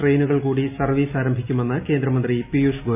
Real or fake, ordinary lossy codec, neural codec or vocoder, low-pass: real; MP3, 32 kbps; none; 3.6 kHz